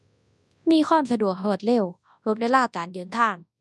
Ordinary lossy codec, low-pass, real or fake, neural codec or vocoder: none; none; fake; codec, 24 kHz, 0.9 kbps, WavTokenizer, large speech release